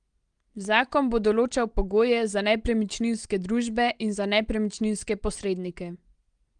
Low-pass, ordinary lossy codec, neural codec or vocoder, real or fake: 9.9 kHz; Opus, 24 kbps; none; real